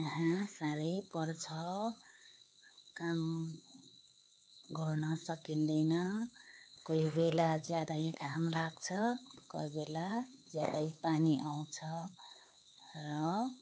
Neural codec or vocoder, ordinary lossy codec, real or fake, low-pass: codec, 16 kHz, 4 kbps, X-Codec, HuBERT features, trained on LibriSpeech; none; fake; none